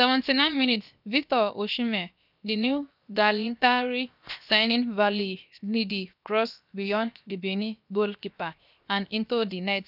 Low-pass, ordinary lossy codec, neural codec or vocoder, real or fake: 5.4 kHz; none; codec, 16 kHz, 0.7 kbps, FocalCodec; fake